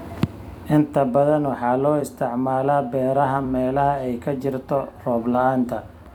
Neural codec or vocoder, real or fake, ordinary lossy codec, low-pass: vocoder, 44.1 kHz, 128 mel bands every 256 samples, BigVGAN v2; fake; none; 19.8 kHz